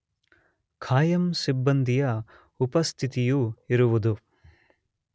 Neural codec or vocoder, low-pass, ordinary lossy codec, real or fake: none; none; none; real